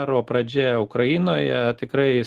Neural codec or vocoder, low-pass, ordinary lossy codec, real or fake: none; 14.4 kHz; Opus, 16 kbps; real